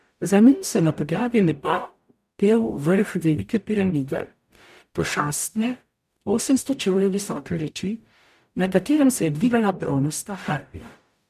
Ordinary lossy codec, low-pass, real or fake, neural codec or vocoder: none; 14.4 kHz; fake; codec, 44.1 kHz, 0.9 kbps, DAC